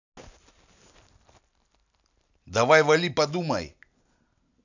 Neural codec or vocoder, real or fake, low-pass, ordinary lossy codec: none; real; 7.2 kHz; none